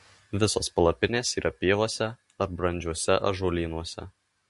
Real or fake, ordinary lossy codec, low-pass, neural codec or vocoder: real; MP3, 48 kbps; 14.4 kHz; none